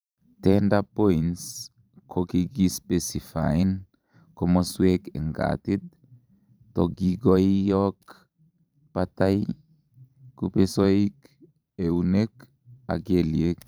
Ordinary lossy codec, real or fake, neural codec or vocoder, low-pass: none; fake; vocoder, 44.1 kHz, 128 mel bands every 512 samples, BigVGAN v2; none